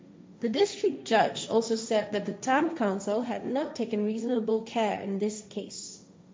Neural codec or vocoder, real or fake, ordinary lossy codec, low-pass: codec, 16 kHz, 1.1 kbps, Voila-Tokenizer; fake; none; none